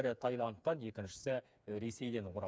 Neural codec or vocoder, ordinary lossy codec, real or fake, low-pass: codec, 16 kHz, 4 kbps, FreqCodec, smaller model; none; fake; none